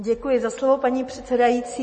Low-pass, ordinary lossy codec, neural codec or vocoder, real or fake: 10.8 kHz; MP3, 32 kbps; none; real